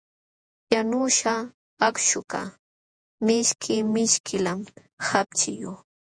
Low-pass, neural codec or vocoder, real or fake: 9.9 kHz; vocoder, 48 kHz, 128 mel bands, Vocos; fake